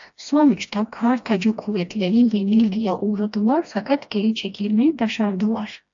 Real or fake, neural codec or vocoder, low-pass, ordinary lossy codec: fake; codec, 16 kHz, 1 kbps, FreqCodec, smaller model; 7.2 kHz; AAC, 64 kbps